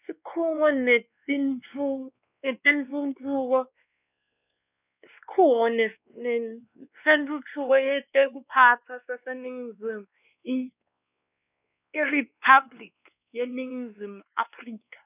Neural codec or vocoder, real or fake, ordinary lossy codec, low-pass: codec, 16 kHz, 2 kbps, X-Codec, WavLM features, trained on Multilingual LibriSpeech; fake; none; 3.6 kHz